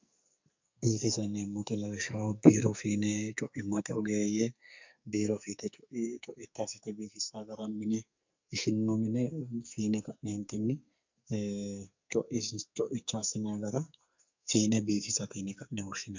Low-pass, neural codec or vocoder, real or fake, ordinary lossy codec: 7.2 kHz; codec, 44.1 kHz, 2.6 kbps, SNAC; fake; MP3, 64 kbps